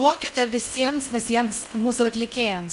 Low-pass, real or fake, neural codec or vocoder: 10.8 kHz; fake; codec, 16 kHz in and 24 kHz out, 0.6 kbps, FocalCodec, streaming, 4096 codes